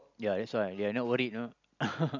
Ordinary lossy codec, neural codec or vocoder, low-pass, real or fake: none; none; 7.2 kHz; real